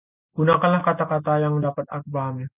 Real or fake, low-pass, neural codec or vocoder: real; 3.6 kHz; none